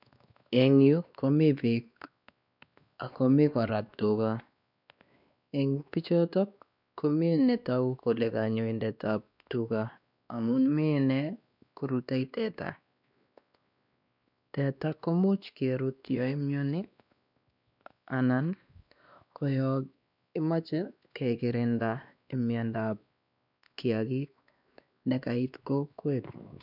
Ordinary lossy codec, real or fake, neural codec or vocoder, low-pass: none; fake; codec, 16 kHz, 2 kbps, X-Codec, WavLM features, trained on Multilingual LibriSpeech; 5.4 kHz